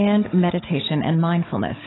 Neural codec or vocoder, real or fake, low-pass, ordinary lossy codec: codec, 16 kHz, 16 kbps, FunCodec, trained on Chinese and English, 50 frames a second; fake; 7.2 kHz; AAC, 16 kbps